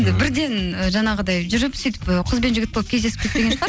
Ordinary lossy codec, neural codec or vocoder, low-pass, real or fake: none; none; none; real